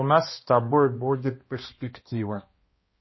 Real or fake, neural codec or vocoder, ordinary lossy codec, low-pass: fake; codec, 16 kHz, 1 kbps, X-Codec, HuBERT features, trained on balanced general audio; MP3, 24 kbps; 7.2 kHz